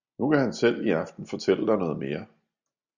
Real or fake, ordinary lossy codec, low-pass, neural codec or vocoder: real; Opus, 64 kbps; 7.2 kHz; none